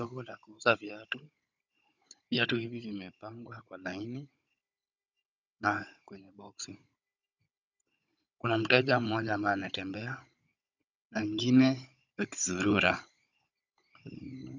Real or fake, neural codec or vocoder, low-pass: fake; codec, 16 kHz, 16 kbps, FunCodec, trained on Chinese and English, 50 frames a second; 7.2 kHz